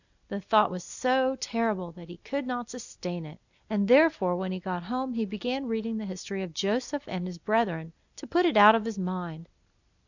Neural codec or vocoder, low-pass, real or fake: none; 7.2 kHz; real